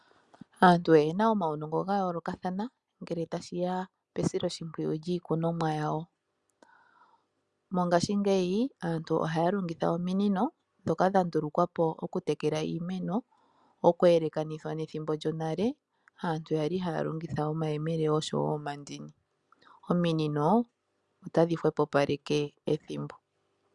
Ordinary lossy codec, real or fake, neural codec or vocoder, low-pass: Opus, 64 kbps; real; none; 10.8 kHz